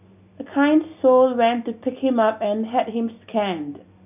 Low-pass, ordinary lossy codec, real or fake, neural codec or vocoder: 3.6 kHz; none; real; none